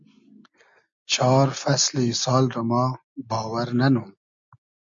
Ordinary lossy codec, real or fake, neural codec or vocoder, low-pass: MP3, 48 kbps; real; none; 7.2 kHz